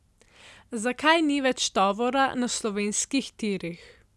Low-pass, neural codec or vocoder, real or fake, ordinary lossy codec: none; none; real; none